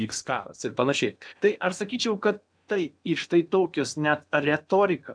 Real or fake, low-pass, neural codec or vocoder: fake; 9.9 kHz; codec, 16 kHz in and 24 kHz out, 0.8 kbps, FocalCodec, streaming, 65536 codes